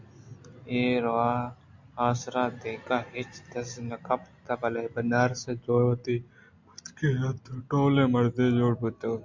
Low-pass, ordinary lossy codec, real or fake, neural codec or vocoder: 7.2 kHz; MP3, 64 kbps; real; none